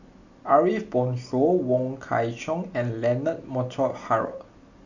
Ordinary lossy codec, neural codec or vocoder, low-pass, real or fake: none; none; 7.2 kHz; real